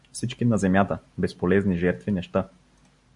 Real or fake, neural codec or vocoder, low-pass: real; none; 10.8 kHz